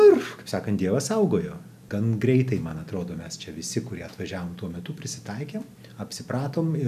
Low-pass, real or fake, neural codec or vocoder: 14.4 kHz; real; none